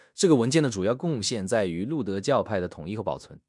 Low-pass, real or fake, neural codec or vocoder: 10.8 kHz; fake; codec, 16 kHz in and 24 kHz out, 0.9 kbps, LongCat-Audio-Codec, fine tuned four codebook decoder